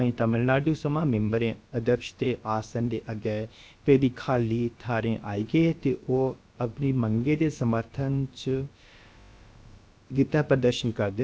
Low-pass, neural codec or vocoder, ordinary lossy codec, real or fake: none; codec, 16 kHz, about 1 kbps, DyCAST, with the encoder's durations; none; fake